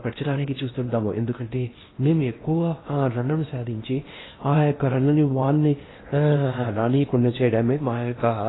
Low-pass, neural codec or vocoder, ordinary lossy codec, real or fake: 7.2 kHz; codec, 16 kHz in and 24 kHz out, 0.8 kbps, FocalCodec, streaming, 65536 codes; AAC, 16 kbps; fake